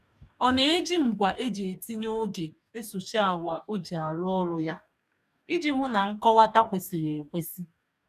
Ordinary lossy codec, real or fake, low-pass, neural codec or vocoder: none; fake; 14.4 kHz; codec, 44.1 kHz, 2.6 kbps, DAC